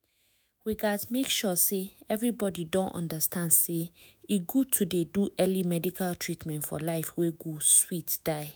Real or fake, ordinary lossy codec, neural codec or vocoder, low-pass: fake; none; autoencoder, 48 kHz, 128 numbers a frame, DAC-VAE, trained on Japanese speech; none